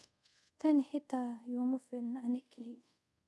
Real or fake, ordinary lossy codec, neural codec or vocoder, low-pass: fake; none; codec, 24 kHz, 0.5 kbps, DualCodec; none